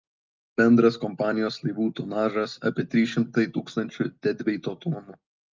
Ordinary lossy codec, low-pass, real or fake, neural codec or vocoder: Opus, 24 kbps; 7.2 kHz; real; none